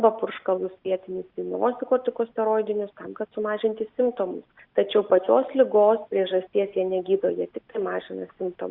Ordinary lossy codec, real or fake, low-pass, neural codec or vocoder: Opus, 64 kbps; real; 5.4 kHz; none